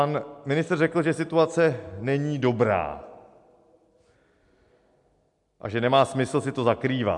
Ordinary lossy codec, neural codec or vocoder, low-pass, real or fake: MP3, 64 kbps; none; 10.8 kHz; real